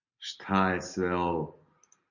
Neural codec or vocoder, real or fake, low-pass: none; real; 7.2 kHz